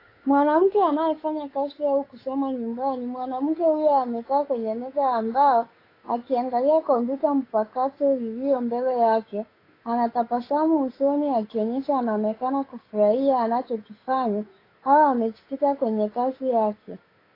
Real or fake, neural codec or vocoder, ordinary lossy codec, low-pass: fake; codec, 16 kHz, 8 kbps, FunCodec, trained on Chinese and English, 25 frames a second; AAC, 24 kbps; 5.4 kHz